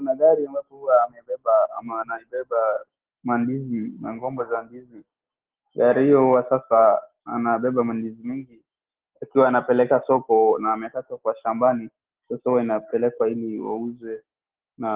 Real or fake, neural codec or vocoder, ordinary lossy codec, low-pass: real; none; Opus, 16 kbps; 3.6 kHz